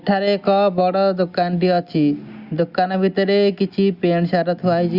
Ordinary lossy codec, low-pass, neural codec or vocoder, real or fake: Opus, 64 kbps; 5.4 kHz; none; real